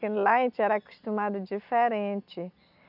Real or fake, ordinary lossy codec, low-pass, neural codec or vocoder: real; none; 5.4 kHz; none